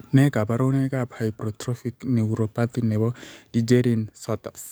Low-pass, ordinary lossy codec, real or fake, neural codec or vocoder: none; none; fake; codec, 44.1 kHz, 7.8 kbps, DAC